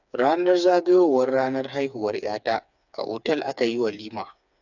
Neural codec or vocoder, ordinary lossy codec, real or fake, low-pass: codec, 16 kHz, 4 kbps, FreqCodec, smaller model; none; fake; 7.2 kHz